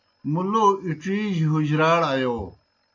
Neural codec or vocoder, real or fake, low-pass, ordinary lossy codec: none; real; 7.2 kHz; AAC, 48 kbps